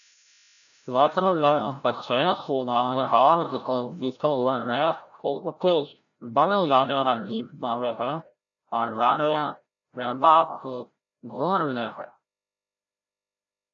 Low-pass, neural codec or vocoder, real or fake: 7.2 kHz; codec, 16 kHz, 0.5 kbps, FreqCodec, larger model; fake